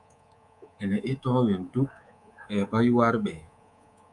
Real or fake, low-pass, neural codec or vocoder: fake; 10.8 kHz; codec, 24 kHz, 3.1 kbps, DualCodec